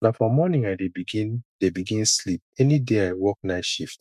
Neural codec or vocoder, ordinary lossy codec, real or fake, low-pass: autoencoder, 48 kHz, 128 numbers a frame, DAC-VAE, trained on Japanese speech; MP3, 96 kbps; fake; 14.4 kHz